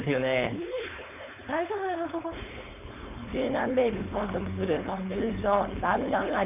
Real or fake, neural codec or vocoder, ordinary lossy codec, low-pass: fake; codec, 16 kHz, 4.8 kbps, FACodec; none; 3.6 kHz